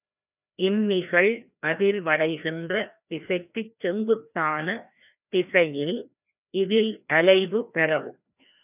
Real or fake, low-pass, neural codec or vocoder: fake; 3.6 kHz; codec, 16 kHz, 1 kbps, FreqCodec, larger model